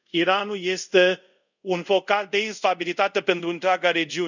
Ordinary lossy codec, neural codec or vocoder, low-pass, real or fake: none; codec, 24 kHz, 0.5 kbps, DualCodec; 7.2 kHz; fake